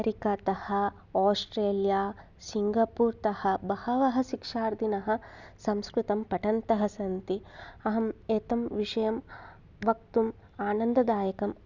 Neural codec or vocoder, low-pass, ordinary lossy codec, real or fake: none; 7.2 kHz; none; real